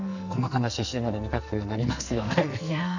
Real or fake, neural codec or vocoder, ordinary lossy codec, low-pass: fake; codec, 44.1 kHz, 2.6 kbps, SNAC; none; 7.2 kHz